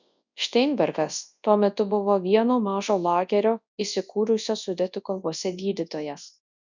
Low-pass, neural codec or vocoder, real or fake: 7.2 kHz; codec, 24 kHz, 0.9 kbps, WavTokenizer, large speech release; fake